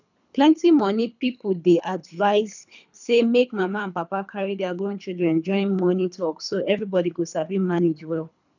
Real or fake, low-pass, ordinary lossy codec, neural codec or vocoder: fake; 7.2 kHz; none; codec, 24 kHz, 3 kbps, HILCodec